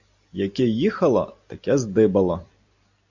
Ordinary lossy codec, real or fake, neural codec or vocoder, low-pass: AAC, 48 kbps; real; none; 7.2 kHz